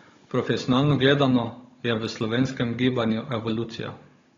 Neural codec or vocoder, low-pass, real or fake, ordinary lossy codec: codec, 16 kHz, 16 kbps, FunCodec, trained on Chinese and English, 50 frames a second; 7.2 kHz; fake; AAC, 32 kbps